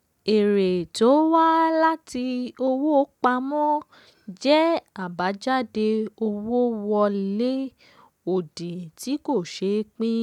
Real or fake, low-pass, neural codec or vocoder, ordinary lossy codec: real; 19.8 kHz; none; none